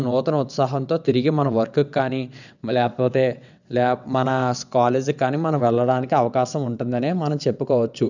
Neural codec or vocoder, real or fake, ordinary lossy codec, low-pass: vocoder, 22.05 kHz, 80 mel bands, WaveNeXt; fake; none; 7.2 kHz